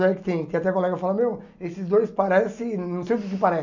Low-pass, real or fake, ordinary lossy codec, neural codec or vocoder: 7.2 kHz; real; none; none